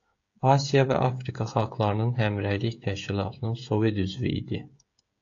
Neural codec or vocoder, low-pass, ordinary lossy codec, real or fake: codec, 16 kHz, 16 kbps, FreqCodec, smaller model; 7.2 kHz; AAC, 48 kbps; fake